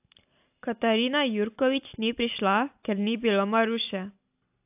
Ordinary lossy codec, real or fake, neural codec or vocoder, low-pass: AAC, 32 kbps; real; none; 3.6 kHz